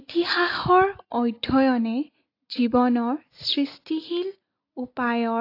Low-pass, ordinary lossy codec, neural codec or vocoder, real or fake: 5.4 kHz; AAC, 32 kbps; none; real